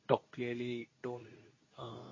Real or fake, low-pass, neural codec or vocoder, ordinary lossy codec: fake; 7.2 kHz; codec, 24 kHz, 0.9 kbps, WavTokenizer, medium speech release version 2; MP3, 32 kbps